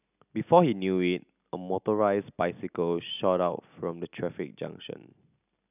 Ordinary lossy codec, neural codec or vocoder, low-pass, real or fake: none; none; 3.6 kHz; real